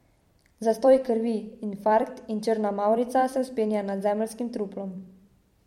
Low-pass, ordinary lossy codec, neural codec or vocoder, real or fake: 19.8 kHz; MP3, 64 kbps; vocoder, 44.1 kHz, 128 mel bands every 256 samples, BigVGAN v2; fake